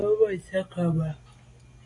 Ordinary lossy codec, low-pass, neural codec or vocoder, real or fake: AAC, 32 kbps; 10.8 kHz; none; real